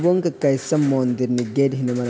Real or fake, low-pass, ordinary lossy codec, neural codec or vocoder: real; none; none; none